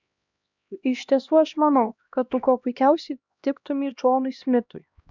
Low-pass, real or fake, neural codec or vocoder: 7.2 kHz; fake; codec, 16 kHz, 1 kbps, X-Codec, HuBERT features, trained on LibriSpeech